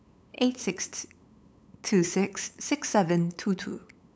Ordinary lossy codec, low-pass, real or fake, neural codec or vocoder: none; none; fake; codec, 16 kHz, 8 kbps, FunCodec, trained on LibriTTS, 25 frames a second